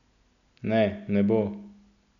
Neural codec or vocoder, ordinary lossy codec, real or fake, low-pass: none; none; real; 7.2 kHz